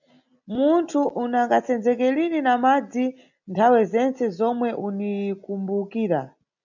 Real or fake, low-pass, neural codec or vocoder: real; 7.2 kHz; none